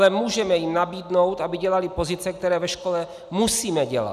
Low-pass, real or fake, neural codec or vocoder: 14.4 kHz; real; none